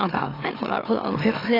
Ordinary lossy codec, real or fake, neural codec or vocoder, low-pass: AAC, 24 kbps; fake; autoencoder, 44.1 kHz, a latent of 192 numbers a frame, MeloTTS; 5.4 kHz